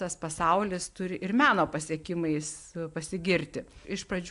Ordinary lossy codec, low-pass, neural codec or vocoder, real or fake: AAC, 64 kbps; 10.8 kHz; none; real